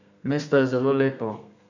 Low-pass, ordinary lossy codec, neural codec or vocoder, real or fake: 7.2 kHz; none; codec, 32 kHz, 1.9 kbps, SNAC; fake